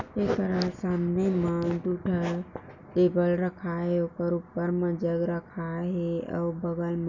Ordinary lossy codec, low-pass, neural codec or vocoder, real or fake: none; 7.2 kHz; none; real